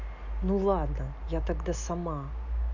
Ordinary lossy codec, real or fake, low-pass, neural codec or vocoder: none; real; 7.2 kHz; none